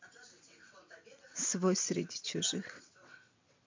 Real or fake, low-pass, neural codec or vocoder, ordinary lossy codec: fake; 7.2 kHz; vocoder, 22.05 kHz, 80 mel bands, WaveNeXt; MP3, 48 kbps